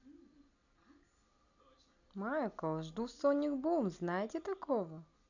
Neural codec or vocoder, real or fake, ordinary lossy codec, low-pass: none; real; none; 7.2 kHz